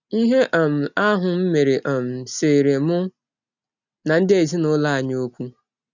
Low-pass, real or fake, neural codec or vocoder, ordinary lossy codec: 7.2 kHz; real; none; none